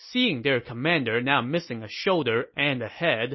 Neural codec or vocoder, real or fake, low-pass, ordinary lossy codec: none; real; 7.2 kHz; MP3, 24 kbps